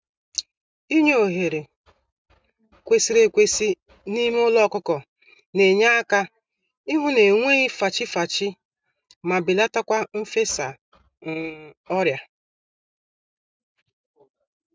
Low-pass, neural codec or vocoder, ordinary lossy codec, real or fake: none; none; none; real